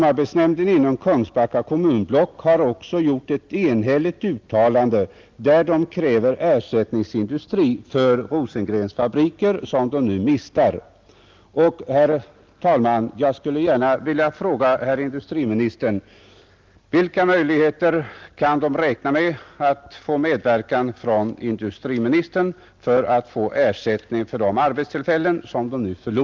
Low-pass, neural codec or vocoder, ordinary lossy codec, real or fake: 7.2 kHz; none; Opus, 32 kbps; real